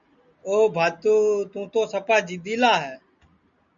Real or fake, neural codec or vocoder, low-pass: real; none; 7.2 kHz